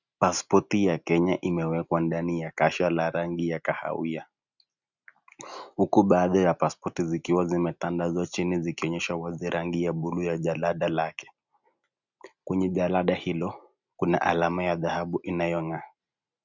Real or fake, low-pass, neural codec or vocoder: real; 7.2 kHz; none